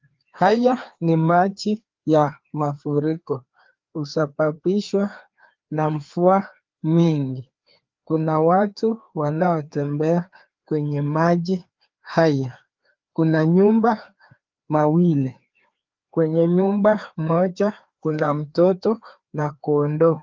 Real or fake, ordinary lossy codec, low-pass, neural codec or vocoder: fake; Opus, 32 kbps; 7.2 kHz; codec, 16 kHz, 2 kbps, FreqCodec, larger model